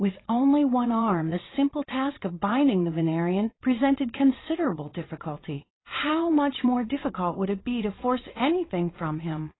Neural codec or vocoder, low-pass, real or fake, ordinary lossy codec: vocoder, 22.05 kHz, 80 mel bands, WaveNeXt; 7.2 kHz; fake; AAC, 16 kbps